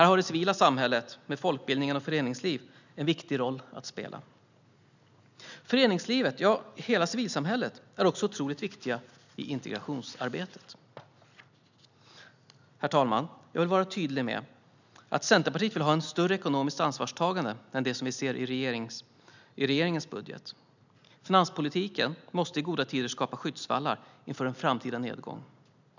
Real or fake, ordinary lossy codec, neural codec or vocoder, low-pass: real; none; none; 7.2 kHz